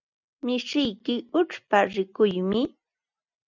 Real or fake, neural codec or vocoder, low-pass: real; none; 7.2 kHz